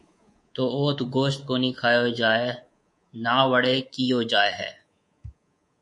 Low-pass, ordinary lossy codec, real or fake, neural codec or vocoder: 10.8 kHz; MP3, 48 kbps; fake; codec, 24 kHz, 3.1 kbps, DualCodec